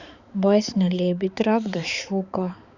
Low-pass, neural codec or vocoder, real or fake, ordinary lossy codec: 7.2 kHz; codec, 16 kHz, 4 kbps, X-Codec, HuBERT features, trained on balanced general audio; fake; Opus, 64 kbps